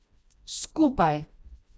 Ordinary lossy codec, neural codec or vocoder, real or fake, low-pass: none; codec, 16 kHz, 1 kbps, FreqCodec, smaller model; fake; none